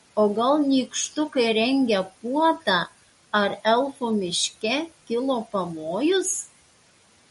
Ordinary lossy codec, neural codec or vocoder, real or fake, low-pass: MP3, 48 kbps; none; real; 19.8 kHz